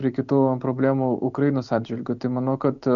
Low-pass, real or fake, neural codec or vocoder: 7.2 kHz; real; none